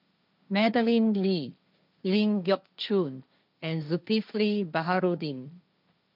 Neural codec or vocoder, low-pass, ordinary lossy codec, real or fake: codec, 16 kHz, 1.1 kbps, Voila-Tokenizer; 5.4 kHz; none; fake